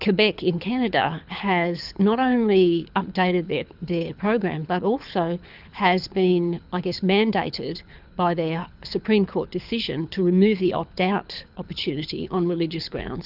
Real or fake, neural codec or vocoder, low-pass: fake; codec, 24 kHz, 6 kbps, HILCodec; 5.4 kHz